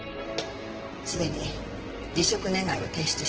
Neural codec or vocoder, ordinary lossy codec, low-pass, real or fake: vocoder, 44.1 kHz, 128 mel bands, Pupu-Vocoder; Opus, 16 kbps; 7.2 kHz; fake